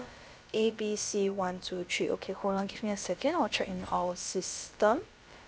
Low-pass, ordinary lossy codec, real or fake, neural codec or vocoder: none; none; fake; codec, 16 kHz, about 1 kbps, DyCAST, with the encoder's durations